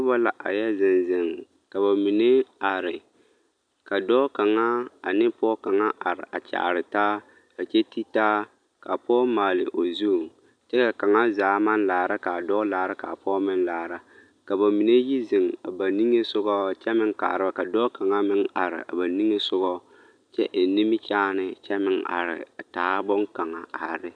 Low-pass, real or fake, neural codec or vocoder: 9.9 kHz; real; none